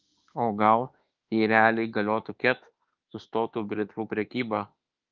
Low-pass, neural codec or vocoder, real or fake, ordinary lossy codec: 7.2 kHz; autoencoder, 48 kHz, 32 numbers a frame, DAC-VAE, trained on Japanese speech; fake; Opus, 24 kbps